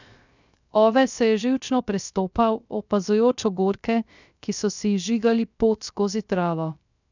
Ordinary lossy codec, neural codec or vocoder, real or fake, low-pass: none; codec, 16 kHz, 0.3 kbps, FocalCodec; fake; 7.2 kHz